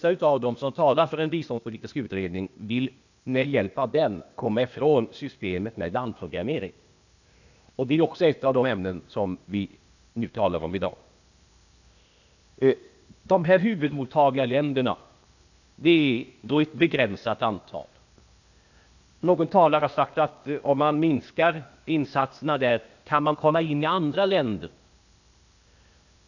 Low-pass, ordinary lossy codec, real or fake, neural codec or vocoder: 7.2 kHz; none; fake; codec, 16 kHz, 0.8 kbps, ZipCodec